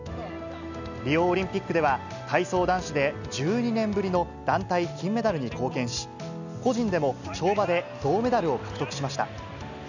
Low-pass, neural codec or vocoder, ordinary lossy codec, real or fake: 7.2 kHz; none; none; real